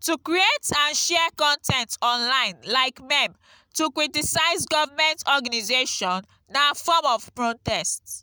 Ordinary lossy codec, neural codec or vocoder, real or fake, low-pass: none; none; real; none